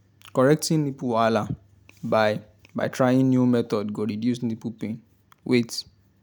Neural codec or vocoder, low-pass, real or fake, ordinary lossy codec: none; 19.8 kHz; real; none